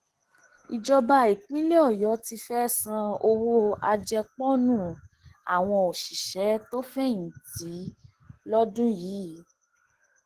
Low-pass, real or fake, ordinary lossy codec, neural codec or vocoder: 14.4 kHz; fake; Opus, 16 kbps; codec, 44.1 kHz, 7.8 kbps, DAC